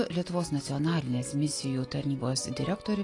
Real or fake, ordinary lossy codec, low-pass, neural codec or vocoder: real; AAC, 32 kbps; 10.8 kHz; none